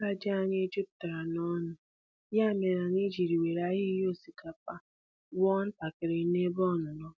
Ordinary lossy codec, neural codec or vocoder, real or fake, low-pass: none; none; real; none